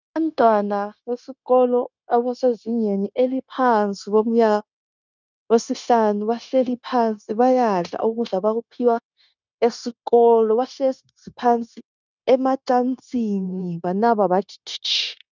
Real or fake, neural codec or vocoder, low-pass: fake; codec, 16 kHz, 0.9 kbps, LongCat-Audio-Codec; 7.2 kHz